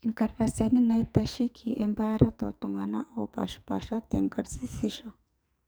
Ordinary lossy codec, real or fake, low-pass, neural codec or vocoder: none; fake; none; codec, 44.1 kHz, 2.6 kbps, SNAC